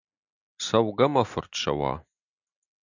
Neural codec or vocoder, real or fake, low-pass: none; real; 7.2 kHz